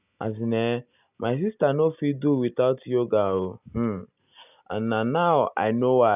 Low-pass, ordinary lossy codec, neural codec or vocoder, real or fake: 3.6 kHz; none; none; real